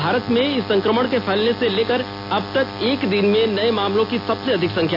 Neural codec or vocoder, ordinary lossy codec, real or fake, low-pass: none; none; real; 5.4 kHz